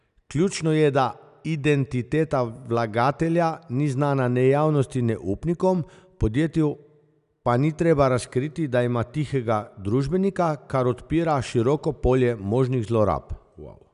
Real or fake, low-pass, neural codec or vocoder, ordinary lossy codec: real; 10.8 kHz; none; none